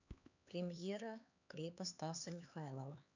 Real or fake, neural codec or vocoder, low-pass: fake; codec, 16 kHz, 4 kbps, X-Codec, HuBERT features, trained on LibriSpeech; 7.2 kHz